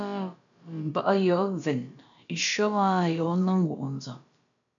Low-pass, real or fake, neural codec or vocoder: 7.2 kHz; fake; codec, 16 kHz, about 1 kbps, DyCAST, with the encoder's durations